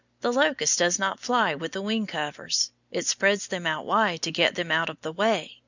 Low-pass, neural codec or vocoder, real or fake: 7.2 kHz; none; real